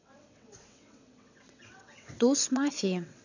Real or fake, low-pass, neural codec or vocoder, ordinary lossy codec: fake; 7.2 kHz; vocoder, 22.05 kHz, 80 mel bands, WaveNeXt; none